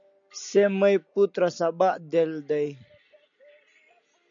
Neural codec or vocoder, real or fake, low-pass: none; real; 7.2 kHz